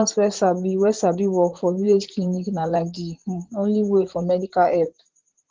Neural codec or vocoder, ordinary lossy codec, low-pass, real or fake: vocoder, 44.1 kHz, 128 mel bands, Pupu-Vocoder; Opus, 16 kbps; 7.2 kHz; fake